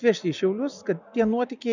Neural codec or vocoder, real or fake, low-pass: none; real; 7.2 kHz